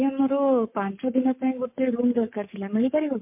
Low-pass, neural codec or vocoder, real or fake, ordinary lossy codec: 3.6 kHz; codec, 44.1 kHz, 7.8 kbps, Pupu-Codec; fake; MP3, 32 kbps